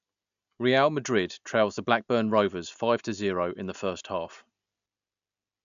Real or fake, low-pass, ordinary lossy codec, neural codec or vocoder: real; 7.2 kHz; AAC, 96 kbps; none